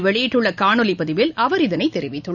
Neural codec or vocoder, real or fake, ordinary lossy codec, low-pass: none; real; none; 7.2 kHz